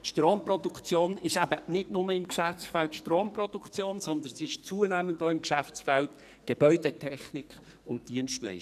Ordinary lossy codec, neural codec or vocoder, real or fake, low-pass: none; codec, 44.1 kHz, 2.6 kbps, SNAC; fake; 14.4 kHz